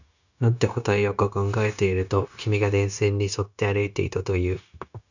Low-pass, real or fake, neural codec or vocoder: 7.2 kHz; fake; codec, 16 kHz, 0.9 kbps, LongCat-Audio-Codec